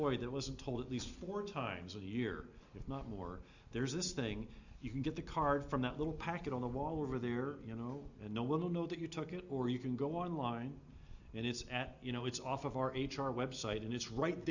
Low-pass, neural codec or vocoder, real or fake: 7.2 kHz; none; real